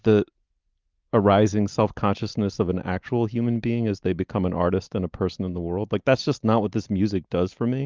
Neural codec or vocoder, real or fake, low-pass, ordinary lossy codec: none; real; 7.2 kHz; Opus, 24 kbps